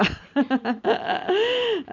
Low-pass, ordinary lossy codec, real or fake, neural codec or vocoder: 7.2 kHz; none; real; none